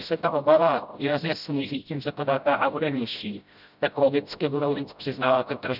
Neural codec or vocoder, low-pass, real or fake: codec, 16 kHz, 0.5 kbps, FreqCodec, smaller model; 5.4 kHz; fake